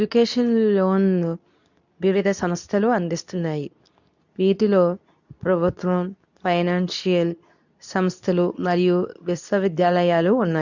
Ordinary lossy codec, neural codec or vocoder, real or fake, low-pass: none; codec, 24 kHz, 0.9 kbps, WavTokenizer, medium speech release version 2; fake; 7.2 kHz